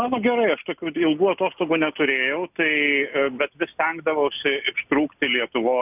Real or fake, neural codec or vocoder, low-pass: real; none; 3.6 kHz